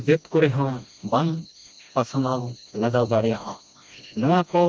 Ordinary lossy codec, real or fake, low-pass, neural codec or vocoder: none; fake; none; codec, 16 kHz, 1 kbps, FreqCodec, smaller model